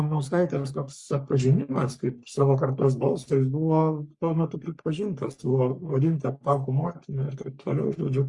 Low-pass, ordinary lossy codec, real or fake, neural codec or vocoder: 10.8 kHz; Opus, 64 kbps; fake; codec, 44.1 kHz, 3.4 kbps, Pupu-Codec